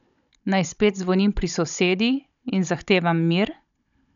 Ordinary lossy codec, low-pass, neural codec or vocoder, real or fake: none; 7.2 kHz; codec, 16 kHz, 16 kbps, FunCodec, trained on Chinese and English, 50 frames a second; fake